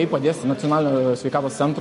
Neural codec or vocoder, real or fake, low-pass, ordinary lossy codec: none; real; 14.4 kHz; MP3, 48 kbps